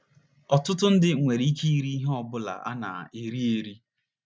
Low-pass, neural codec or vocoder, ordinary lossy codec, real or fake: none; none; none; real